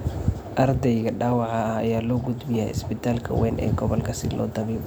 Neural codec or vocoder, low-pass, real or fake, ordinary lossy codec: none; none; real; none